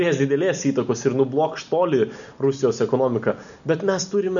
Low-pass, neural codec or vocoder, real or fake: 7.2 kHz; none; real